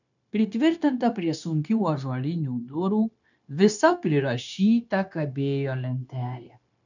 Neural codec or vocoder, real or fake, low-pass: codec, 16 kHz, 0.9 kbps, LongCat-Audio-Codec; fake; 7.2 kHz